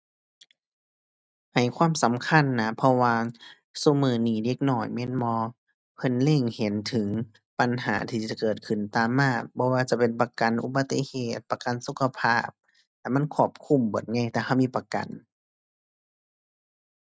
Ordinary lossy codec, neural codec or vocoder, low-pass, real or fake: none; none; none; real